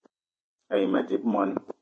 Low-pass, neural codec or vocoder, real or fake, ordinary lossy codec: 9.9 kHz; vocoder, 22.05 kHz, 80 mel bands, WaveNeXt; fake; MP3, 32 kbps